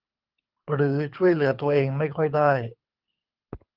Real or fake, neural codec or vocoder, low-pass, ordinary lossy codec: fake; codec, 24 kHz, 6 kbps, HILCodec; 5.4 kHz; Opus, 32 kbps